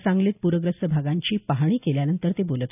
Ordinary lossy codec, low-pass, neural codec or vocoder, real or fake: none; 3.6 kHz; none; real